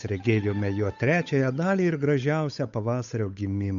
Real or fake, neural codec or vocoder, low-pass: fake; codec, 16 kHz, 8 kbps, FunCodec, trained on Chinese and English, 25 frames a second; 7.2 kHz